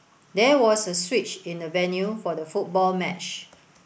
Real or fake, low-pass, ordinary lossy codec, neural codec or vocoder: real; none; none; none